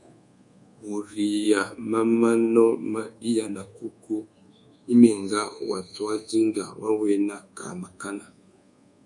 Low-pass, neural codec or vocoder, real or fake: 10.8 kHz; codec, 24 kHz, 1.2 kbps, DualCodec; fake